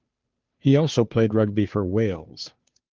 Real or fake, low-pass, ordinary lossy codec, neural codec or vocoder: fake; 7.2 kHz; Opus, 32 kbps; codec, 16 kHz, 2 kbps, FunCodec, trained on Chinese and English, 25 frames a second